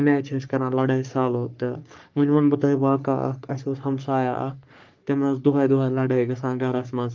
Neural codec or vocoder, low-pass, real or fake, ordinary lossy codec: codec, 44.1 kHz, 3.4 kbps, Pupu-Codec; 7.2 kHz; fake; Opus, 32 kbps